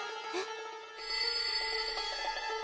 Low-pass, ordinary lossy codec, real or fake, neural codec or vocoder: none; none; real; none